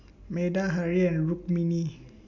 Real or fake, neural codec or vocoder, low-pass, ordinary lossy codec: real; none; 7.2 kHz; none